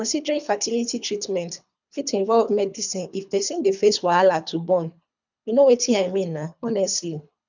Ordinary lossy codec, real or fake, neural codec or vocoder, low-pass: none; fake; codec, 24 kHz, 3 kbps, HILCodec; 7.2 kHz